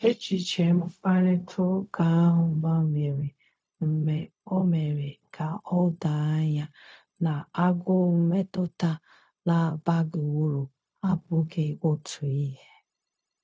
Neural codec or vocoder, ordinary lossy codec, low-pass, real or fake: codec, 16 kHz, 0.4 kbps, LongCat-Audio-Codec; none; none; fake